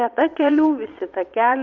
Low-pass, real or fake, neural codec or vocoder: 7.2 kHz; fake; vocoder, 22.05 kHz, 80 mel bands, Vocos